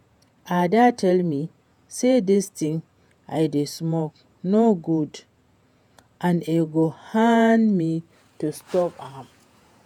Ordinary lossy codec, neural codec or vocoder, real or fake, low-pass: none; vocoder, 48 kHz, 128 mel bands, Vocos; fake; 19.8 kHz